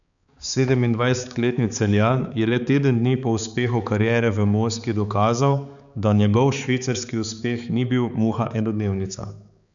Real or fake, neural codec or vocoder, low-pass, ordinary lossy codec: fake; codec, 16 kHz, 4 kbps, X-Codec, HuBERT features, trained on balanced general audio; 7.2 kHz; none